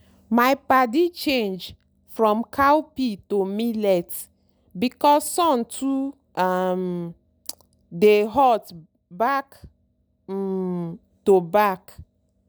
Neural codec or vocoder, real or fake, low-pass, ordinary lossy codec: none; real; none; none